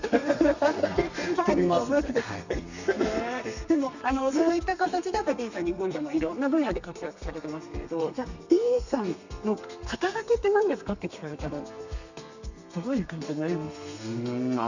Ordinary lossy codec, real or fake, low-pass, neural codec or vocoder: none; fake; 7.2 kHz; codec, 32 kHz, 1.9 kbps, SNAC